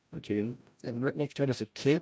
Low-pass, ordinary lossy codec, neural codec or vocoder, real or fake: none; none; codec, 16 kHz, 0.5 kbps, FreqCodec, larger model; fake